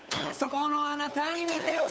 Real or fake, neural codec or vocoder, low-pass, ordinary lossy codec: fake; codec, 16 kHz, 8 kbps, FunCodec, trained on LibriTTS, 25 frames a second; none; none